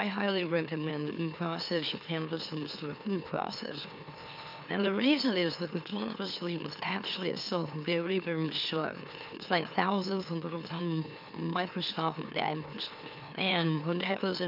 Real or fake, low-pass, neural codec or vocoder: fake; 5.4 kHz; autoencoder, 44.1 kHz, a latent of 192 numbers a frame, MeloTTS